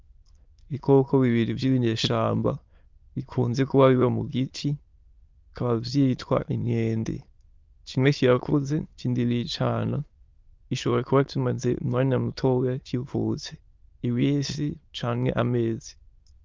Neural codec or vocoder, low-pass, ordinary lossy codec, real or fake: autoencoder, 22.05 kHz, a latent of 192 numbers a frame, VITS, trained on many speakers; 7.2 kHz; Opus, 32 kbps; fake